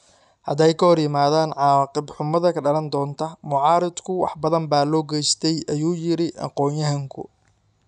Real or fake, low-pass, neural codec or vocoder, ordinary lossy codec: real; 10.8 kHz; none; none